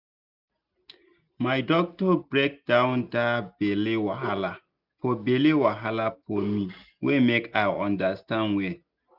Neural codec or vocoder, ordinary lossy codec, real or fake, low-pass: none; none; real; 5.4 kHz